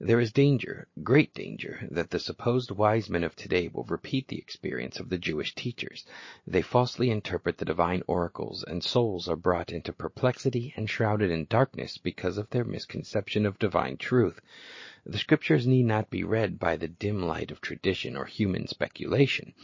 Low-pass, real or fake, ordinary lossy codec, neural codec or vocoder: 7.2 kHz; real; MP3, 32 kbps; none